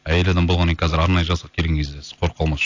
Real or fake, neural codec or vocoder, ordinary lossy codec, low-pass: real; none; AAC, 48 kbps; 7.2 kHz